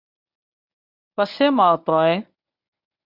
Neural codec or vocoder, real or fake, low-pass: codec, 24 kHz, 0.9 kbps, WavTokenizer, medium speech release version 1; fake; 5.4 kHz